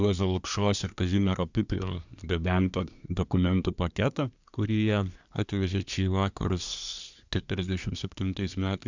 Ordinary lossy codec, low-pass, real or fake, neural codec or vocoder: Opus, 64 kbps; 7.2 kHz; fake; codec, 24 kHz, 1 kbps, SNAC